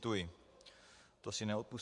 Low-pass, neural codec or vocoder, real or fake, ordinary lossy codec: 10.8 kHz; vocoder, 24 kHz, 100 mel bands, Vocos; fake; AAC, 64 kbps